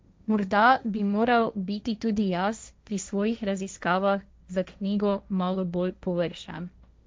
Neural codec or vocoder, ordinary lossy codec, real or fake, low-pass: codec, 16 kHz, 1.1 kbps, Voila-Tokenizer; none; fake; none